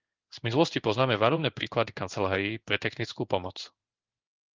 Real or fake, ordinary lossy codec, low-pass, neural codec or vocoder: fake; Opus, 32 kbps; 7.2 kHz; codec, 16 kHz in and 24 kHz out, 1 kbps, XY-Tokenizer